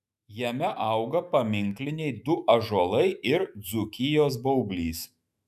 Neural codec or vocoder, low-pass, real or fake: autoencoder, 48 kHz, 128 numbers a frame, DAC-VAE, trained on Japanese speech; 14.4 kHz; fake